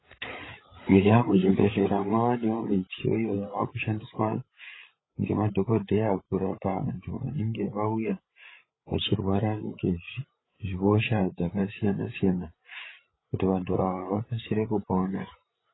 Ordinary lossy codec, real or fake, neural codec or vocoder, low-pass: AAC, 16 kbps; fake; codec, 16 kHz, 8 kbps, FreqCodec, larger model; 7.2 kHz